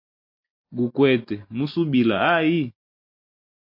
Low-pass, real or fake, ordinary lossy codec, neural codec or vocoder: 5.4 kHz; real; MP3, 32 kbps; none